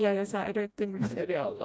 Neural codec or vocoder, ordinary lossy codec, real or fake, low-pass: codec, 16 kHz, 1 kbps, FreqCodec, smaller model; none; fake; none